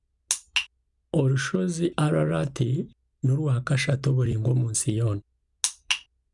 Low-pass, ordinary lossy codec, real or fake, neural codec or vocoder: 10.8 kHz; none; real; none